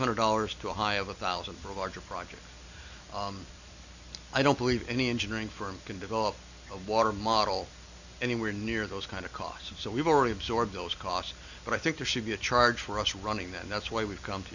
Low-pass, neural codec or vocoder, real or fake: 7.2 kHz; none; real